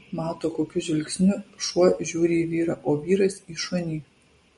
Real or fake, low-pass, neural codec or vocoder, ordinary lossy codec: real; 19.8 kHz; none; MP3, 48 kbps